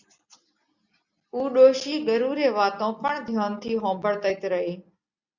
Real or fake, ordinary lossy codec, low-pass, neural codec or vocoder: real; Opus, 64 kbps; 7.2 kHz; none